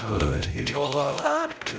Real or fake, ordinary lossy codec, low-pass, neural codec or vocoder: fake; none; none; codec, 16 kHz, 0.5 kbps, X-Codec, WavLM features, trained on Multilingual LibriSpeech